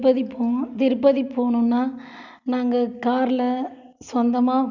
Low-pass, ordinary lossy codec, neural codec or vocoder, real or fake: 7.2 kHz; none; none; real